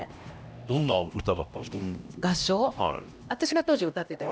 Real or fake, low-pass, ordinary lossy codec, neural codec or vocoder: fake; none; none; codec, 16 kHz, 1 kbps, X-Codec, HuBERT features, trained on LibriSpeech